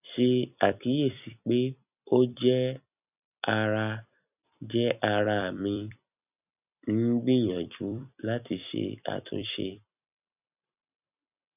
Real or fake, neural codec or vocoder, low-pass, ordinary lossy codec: fake; vocoder, 44.1 kHz, 128 mel bands every 256 samples, BigVGAN v2; 3.6 kHz; none